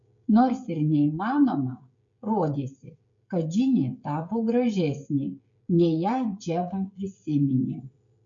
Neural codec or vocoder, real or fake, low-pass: codec, 16 kHz, 16 kbps, FreqCodec, smaller model; fake; 7.2 kHz